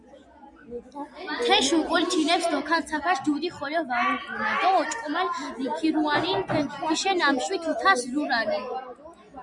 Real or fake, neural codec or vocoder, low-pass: real; none; 10.8 kHz